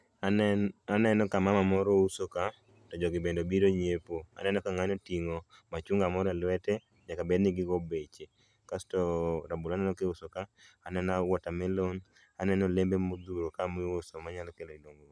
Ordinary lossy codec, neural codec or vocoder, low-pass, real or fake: none; none; none; real